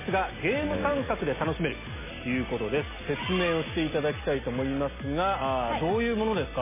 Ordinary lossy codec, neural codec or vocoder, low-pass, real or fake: MP3, 16 kbps; none; 3.6 kHz; real